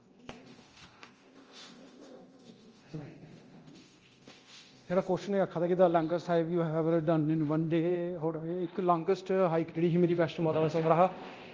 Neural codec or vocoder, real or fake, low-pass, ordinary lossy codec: codec, 24 kHz, 0.9 kbps, DualCodec; fake; 7.2 kHz; Opus, 24 kbps